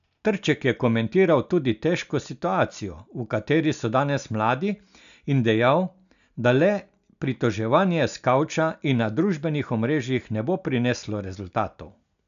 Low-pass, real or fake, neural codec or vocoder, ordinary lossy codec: 7.2 kHz; real; none; none